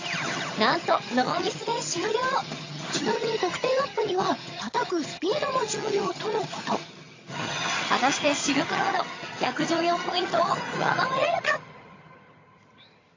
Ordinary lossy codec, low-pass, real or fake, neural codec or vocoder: AAC, 32 kbps; 7.2 kHz; fake; vocoder, 22.05 kHz, 80 mel bands, HiFi-GAN